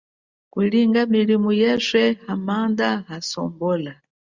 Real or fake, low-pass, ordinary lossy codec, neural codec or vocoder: real; 7.2 kHz; Opus, 64 kbps; none